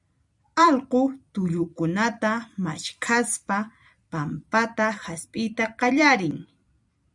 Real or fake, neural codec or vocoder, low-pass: fake; vocoder, 44.1 kHz, 128 mel bands every 256 samples, BigVGAN v2; 10.8 kHz